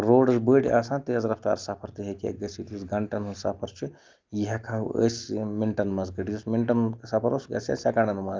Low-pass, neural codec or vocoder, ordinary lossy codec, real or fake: 7.2 kHz; none; Opus, 24 kbps; real